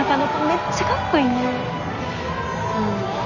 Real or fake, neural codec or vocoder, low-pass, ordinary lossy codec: real; none; 7.2 kHz; none